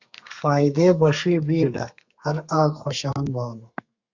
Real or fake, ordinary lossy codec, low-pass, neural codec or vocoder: fake; Opus, 64 kbps; 7.2 kHz; codec, 32 kHz, 1.9 kbps, SNAC